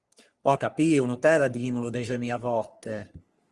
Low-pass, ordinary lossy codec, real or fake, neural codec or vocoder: 10.8 kHz; Opus, 24 kbps; fake; codec, 44.1 kHz, 3.4 kbps, Pupu-Codec